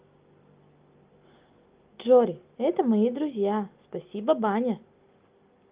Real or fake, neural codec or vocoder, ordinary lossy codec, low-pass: real; none; Opus, 24 kbps; 3.6 kHz